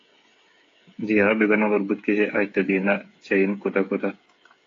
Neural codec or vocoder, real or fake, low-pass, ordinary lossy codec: codec, 16 kHz, 8 kbps, FreqCodec, smaller model; fake; 7.2 kHz; AAC, 32 kbps